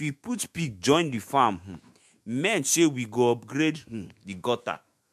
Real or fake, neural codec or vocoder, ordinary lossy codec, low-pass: fake; autoencoder, 48 kHz, 128 numbers a frame, DAC-VAE, trained on Japanese speech; MP3, 64 kbps; 14.4 kHz